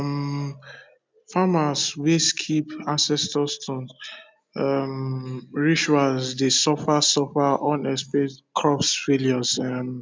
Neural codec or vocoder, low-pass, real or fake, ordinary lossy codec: none; none; real; none